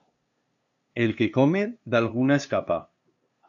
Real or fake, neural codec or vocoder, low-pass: fake; codec, 16 kHz, 2 kbps, FunCodec, trained on LibriTTS, 25 frames a second; 7.2 kHz